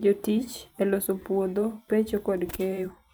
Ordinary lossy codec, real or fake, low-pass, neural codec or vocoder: none; fake; none; vocoder, 44.1 kHz, 128 mel bands every 512 samples, BigVGAN v2